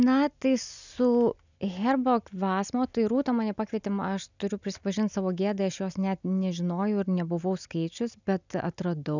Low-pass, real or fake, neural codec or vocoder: 7.2 kHz; real; none